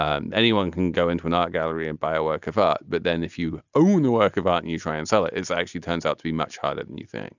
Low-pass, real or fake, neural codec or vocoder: 7.2 kHz; real; none